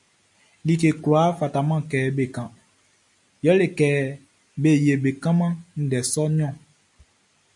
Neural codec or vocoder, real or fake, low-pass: none; real; 10.8 kHz